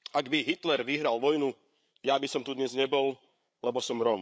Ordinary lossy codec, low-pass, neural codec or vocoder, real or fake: none; none; codec, 16 kHz, 8 kbps, FreqCodec, larger model; fake